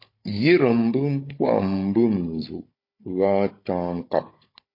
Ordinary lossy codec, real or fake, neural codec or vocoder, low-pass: MP3, 32 kbps; fake; codec, 16 kHz, 16 kbps, FunCodec, trained on Chinese and English, 50 frames a second; 5.4 kHz